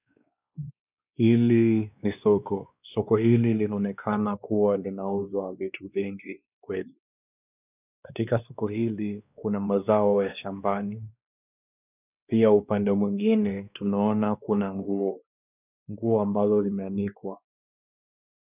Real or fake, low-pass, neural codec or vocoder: fake; 3.6 kHz; codec, 16 kHz, 2 kbps, X-Codec, WavLM features, trained on Multilingual LibriSpeech